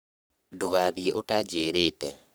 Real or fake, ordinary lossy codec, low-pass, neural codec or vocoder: fake; none; none; codec, 44.1 kHz, 3.4 kbps, Pupu-Codec